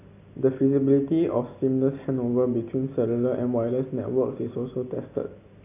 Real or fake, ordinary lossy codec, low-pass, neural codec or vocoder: real; none; 3.6 kHz; none